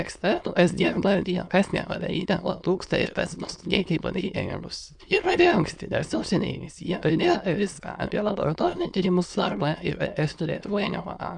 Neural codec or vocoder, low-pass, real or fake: autoencoder, 22.05 kHz, a latent of 192 numbers a frame, VITS, trained on many speakers; 9.9 kHz; fake